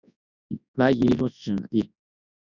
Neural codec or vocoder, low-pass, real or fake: codec, 24 kHz, 0.9 kbps, WavTokenizer, large speech release; 7.2 kHz; fake